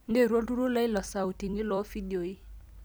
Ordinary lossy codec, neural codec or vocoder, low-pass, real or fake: none; vocoder, 44.1 kHz, 128 mel bands every 256 samples, BigVGAN v2; none; fake